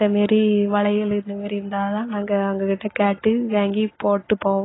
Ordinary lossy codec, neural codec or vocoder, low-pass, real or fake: AAC, 16 kbps; none; 7.2 kHz; real